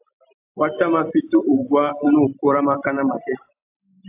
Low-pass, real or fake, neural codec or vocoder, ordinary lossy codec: 3.6 kHz; real; none; AAC, 32 kbps